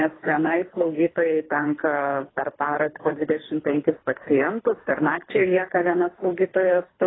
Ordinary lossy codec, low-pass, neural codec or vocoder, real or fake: AAC, 16 kbps; 7.2 kHz; codec, 24 kHz, 3 kbps, HILCodec; fake